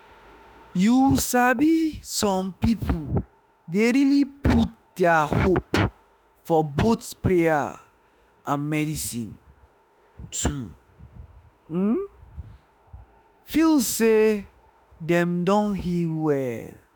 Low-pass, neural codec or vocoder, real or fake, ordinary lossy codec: none; autoencoder, 48 kHz, 32 numbers a frame, DAC-VAE, trained on Japanese speech; fake; none